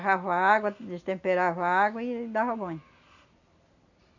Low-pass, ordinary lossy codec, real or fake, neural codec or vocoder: 7.2 kHz; none; real; none